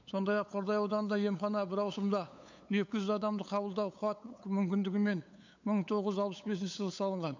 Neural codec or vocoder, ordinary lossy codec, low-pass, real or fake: codec, 16 kHz, 8 kbps, FunCodec, trained on LibriTTS, 25 frames a second; MP3, 64 kbps; 7.2 kHz; fake